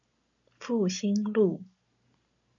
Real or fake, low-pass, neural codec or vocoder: real; 7.2 kHz; none